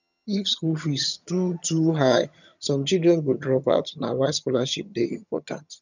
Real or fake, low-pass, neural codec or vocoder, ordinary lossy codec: fake; 7.2 kHz; vocoder, 22.05 kHz, 80 mel bands, HiFi-GAN; none